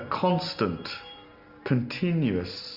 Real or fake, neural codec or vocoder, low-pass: real; none; 5.4 kHz